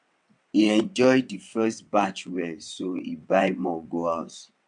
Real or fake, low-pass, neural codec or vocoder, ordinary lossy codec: fake; 9.9 kHz; vocoder, 22.05 kHz, 80 mel bands, WaveNeXt; MP3, 64 kbps